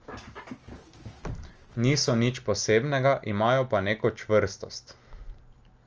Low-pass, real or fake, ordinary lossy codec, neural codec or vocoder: 7.2 kHz; real; Opus, 24 kbps; none